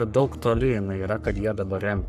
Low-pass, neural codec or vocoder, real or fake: 14.4 kHz; codec, 44.1 kHz, 3.4 kbps, Pupu-Codec; fake